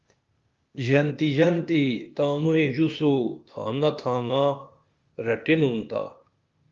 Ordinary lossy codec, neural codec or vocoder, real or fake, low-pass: Opus, 32 kbps; codec, 16 kHz, 0.8 kbps, ZipCodec; fake; 7.2 kHz